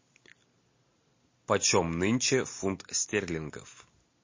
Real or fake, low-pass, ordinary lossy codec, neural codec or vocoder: real; 7.2 kHz; MP3, 32 kbps; none